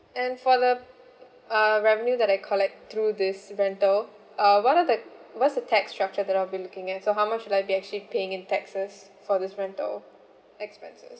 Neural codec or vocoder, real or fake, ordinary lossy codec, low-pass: none; real; none; none